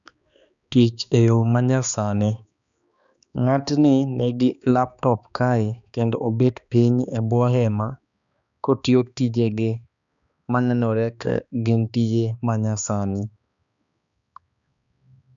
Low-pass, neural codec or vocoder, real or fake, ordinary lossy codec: 7.2 kHz; codec, 16 kHz, 2 kbps, X-Codec, HuBERT features, trained on balanced general audio; fake; none